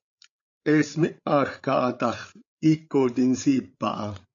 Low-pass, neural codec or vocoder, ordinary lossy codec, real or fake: 7.2 kHz; codec, 16 kHz, 8 kbps, FreqCodec, larger model; AAC, 64 kbps; fake